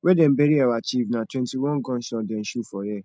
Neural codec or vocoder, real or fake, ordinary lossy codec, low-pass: none; real; none; none